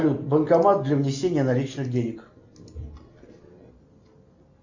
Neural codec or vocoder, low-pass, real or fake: none; 7.2 kHz; real